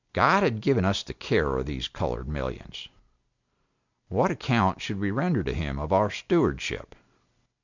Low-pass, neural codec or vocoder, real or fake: 7.2 kHz; none; real